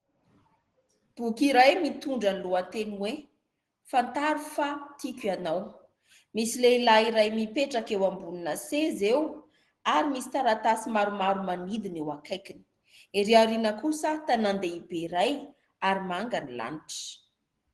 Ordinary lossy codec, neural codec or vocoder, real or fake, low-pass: Opus, 16 kbps; none; real; 10.8 kHz